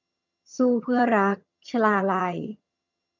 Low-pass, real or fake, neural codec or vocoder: 7.2 kHz; fake; vocoder, 22.05 kHz, 80 mel bands, HiFi-GAN